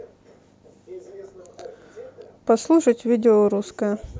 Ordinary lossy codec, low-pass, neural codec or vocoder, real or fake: none; none; none; real